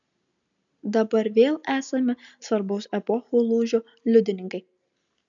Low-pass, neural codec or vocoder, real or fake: 7.2 kHz; none; real